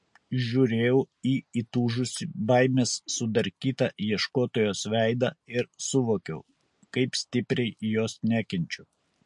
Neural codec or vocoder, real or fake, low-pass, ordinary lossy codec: none; real; 10.8 kHz; MP3, 48 kbps